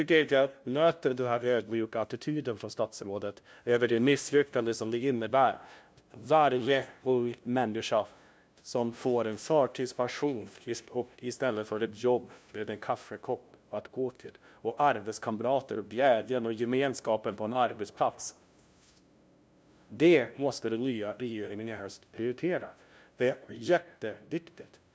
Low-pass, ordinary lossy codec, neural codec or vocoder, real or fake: none; none; codec, 16 kHz, 0.5 kbps, FunCodec, trained on LibriTTS, 25 frames a second; fake